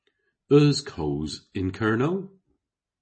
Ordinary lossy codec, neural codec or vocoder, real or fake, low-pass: MP3, 32 kbps; none; real; 9.9 kHz